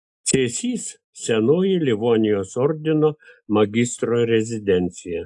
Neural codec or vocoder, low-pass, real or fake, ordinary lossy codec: none; 10.8 kHz; real; AAC, 64 kbps